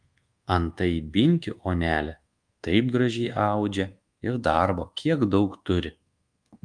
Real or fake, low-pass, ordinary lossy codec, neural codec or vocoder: fake; 9.9 kHz; Opus, 32 kbps; codec, 24 kHz, 1.2 kbps, DualCodec